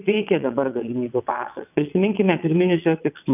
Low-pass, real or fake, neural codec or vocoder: 3.6 kHz; fake; vocoder, 22.05 kHz, 80 mel bands, WaveNeXt